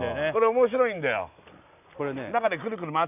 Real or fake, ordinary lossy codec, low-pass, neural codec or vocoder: real; none; 3.6 kHz; none